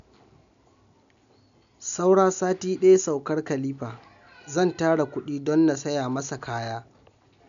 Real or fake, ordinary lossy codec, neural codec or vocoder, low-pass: real; none; none; 7.2 kHz